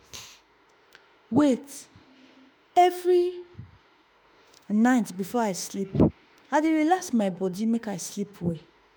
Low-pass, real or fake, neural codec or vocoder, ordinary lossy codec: none; fake; autoencoder, 48 kHz, 32 numbers a frame, DAC-VAE, trained on Japanese speech; none